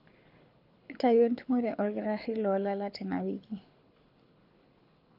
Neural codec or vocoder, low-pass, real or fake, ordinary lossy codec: codec, 24 kHz, 6 kbps, HILCodec; 5.4 kHz; fake; none